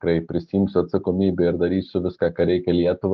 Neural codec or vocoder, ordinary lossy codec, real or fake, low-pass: none; Opus, 32 kbps; real; 7.2 kHz